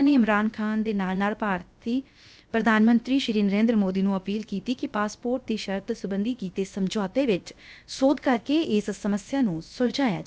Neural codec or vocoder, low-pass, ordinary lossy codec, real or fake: codec, 16 kHz, about 1 kbps, DyCAST, with the encoder's durations; none; none; fake